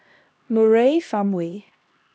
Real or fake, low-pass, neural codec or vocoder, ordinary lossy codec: fake; none; codec, 16 kHz, 1 kbps, X-Codec, HuBERT features, trained on LibriSpeech; none